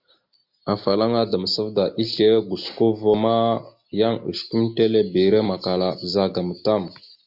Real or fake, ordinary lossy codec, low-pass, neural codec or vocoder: real; MP3, 48 kbps; 5.4 kHz; none